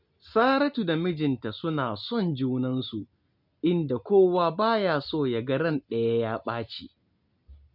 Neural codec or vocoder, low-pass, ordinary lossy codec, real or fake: none; 5.4 kHz; none; real